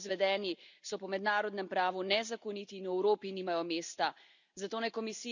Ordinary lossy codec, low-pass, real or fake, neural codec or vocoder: none; 7.2 kHz; real; none